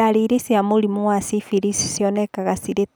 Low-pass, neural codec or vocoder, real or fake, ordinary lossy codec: none; none; real; none